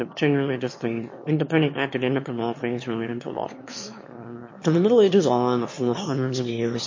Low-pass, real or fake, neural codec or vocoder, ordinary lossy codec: 7.2 kHz; fake; autoencoder, 22.05 kHz, a latent of 192 numbers a frame, VITS, trained on one speaker; MP3, 32 kbps